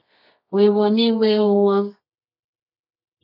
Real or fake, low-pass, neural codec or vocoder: fake; 5.4 kHz; codec, 24 kHz, 0.9 kbps, WavTokenizer, medium music audio release